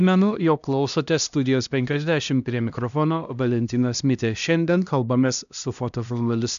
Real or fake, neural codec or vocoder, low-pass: fake; codec, 16 kHz, 1 kbps, X-Codec, HuBERT features, trained on LibriSpeech; 7.2 kHz